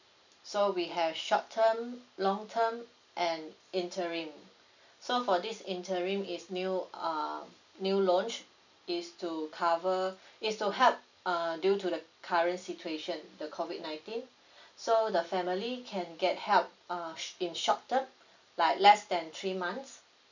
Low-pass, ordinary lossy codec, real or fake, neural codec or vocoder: 7.2 kHz; none; real; none